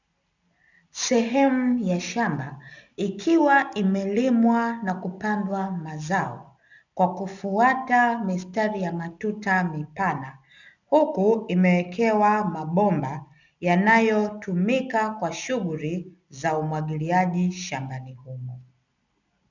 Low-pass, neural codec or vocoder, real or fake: 7.2 kHz; none; real